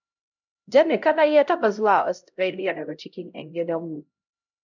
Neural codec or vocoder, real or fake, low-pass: codec, 16 kHz, 0.5 kbps, X-Codec, HuBERT features, trained on LibriSpeech; fake; 7.2 kHz